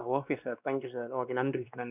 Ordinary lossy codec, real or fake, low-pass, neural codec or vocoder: none; fake; 3.6 kHz; codec, 16 kHz, 2 kbps, X-Codec, WavLM features, trained on Multilingual LibriSpeech